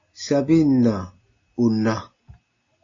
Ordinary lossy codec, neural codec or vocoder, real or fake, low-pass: AAC, 32 kbps; none; real; 7.2 kHz